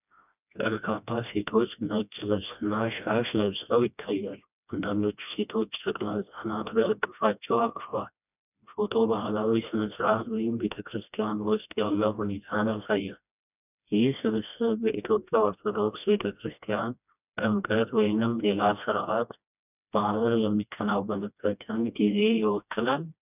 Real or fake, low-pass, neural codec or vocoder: fake; 3.6 kHz; codec, 16 kHz, 1 kbps, FreqCodec, smaller model